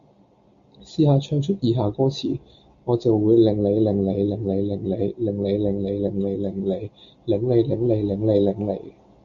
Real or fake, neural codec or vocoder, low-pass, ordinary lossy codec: real; none; 7.2 kHz; MP3, 64 kbps